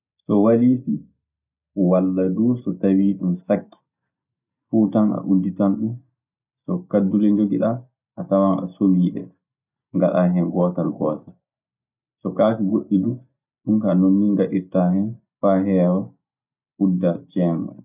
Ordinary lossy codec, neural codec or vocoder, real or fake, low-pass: none; none; real; 3.6 kHz